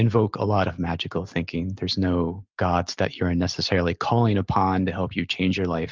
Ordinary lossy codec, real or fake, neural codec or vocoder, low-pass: Opus, 32 kbps; real; none; 7.2 kHz